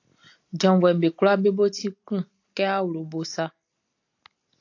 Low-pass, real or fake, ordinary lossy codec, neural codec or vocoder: 7.2 kHz; real; AAC, 48 kbps; none